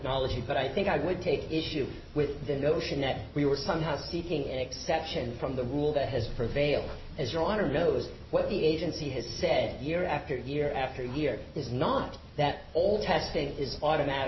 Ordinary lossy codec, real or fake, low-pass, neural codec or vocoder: MP3, 24 kbps; real; 7.2 kHz; none